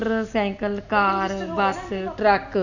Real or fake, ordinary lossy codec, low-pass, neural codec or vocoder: real; none; 7.2 kHz; none